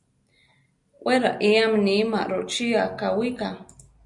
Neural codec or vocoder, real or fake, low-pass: none; real; 10.8 kHz